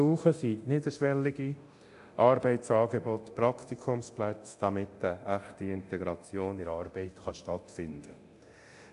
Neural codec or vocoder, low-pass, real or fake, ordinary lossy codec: codec, 24 kHz, 0.9 kbps, DualCodec; 10.8 kHz; fake; AAC, 64 kbps